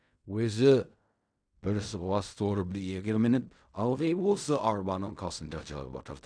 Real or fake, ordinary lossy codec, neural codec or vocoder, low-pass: fake; none; codec, 16 kHz in and 24 kHz out, 0.4 kbps, LongCat-Audio-Codec, fine tuned four codebook decoder; 9.9 kHz